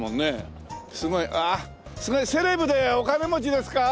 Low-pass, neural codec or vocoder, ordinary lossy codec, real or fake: none; none; none; real